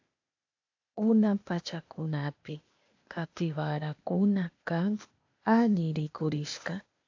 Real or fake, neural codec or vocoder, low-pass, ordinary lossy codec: fake; codec, 16 kHz, 0.8 kbps, ZipCodec; 7.2 kHz; AAC, 48 kbps